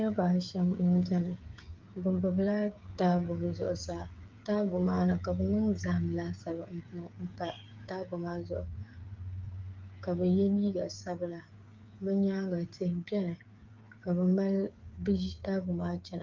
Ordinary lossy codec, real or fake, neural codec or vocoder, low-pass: Opus, 24 kbps; fake; codec, 16 kHz, 8 kbps, FreqCodec, smaller model; 7.2 kHz